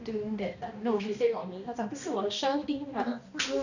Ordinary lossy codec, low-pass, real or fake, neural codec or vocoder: none; 7.2 kHz; fake; codec, 16 kHz, 1 kbps, X-Codec, HuBERT features, trained on balanced general audio